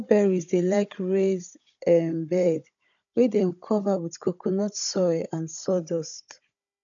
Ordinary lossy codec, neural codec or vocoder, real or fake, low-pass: none; codec, 16 kHz, 16 kbps, FunCodec, trained on Chinese and English, 50 frames a second; fake; 7.2 kHz